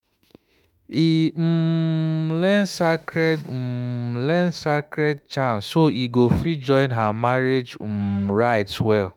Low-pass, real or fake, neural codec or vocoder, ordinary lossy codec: 19.8 kHz; fake; autoencoder, 48 kHz, 32 numbers a frame, DAC-VAE, trained on Japanese speech; none